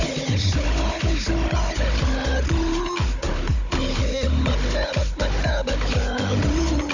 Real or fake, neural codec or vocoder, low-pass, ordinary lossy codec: fake; codec, 16 kHz, 16 kbps, FunCodec, trained on Chinese and English, 50 frames a second; 7.2 kHz; none